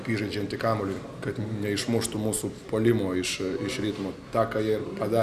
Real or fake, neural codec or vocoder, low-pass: real; none; 14.4 kHz